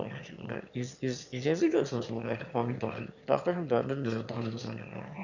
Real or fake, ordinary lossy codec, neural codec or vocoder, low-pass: fake; none; autoencoder, 22.05 kHz, a latent of 192 numbers a frame, VITS, trained on one speaker; 7.2 kHz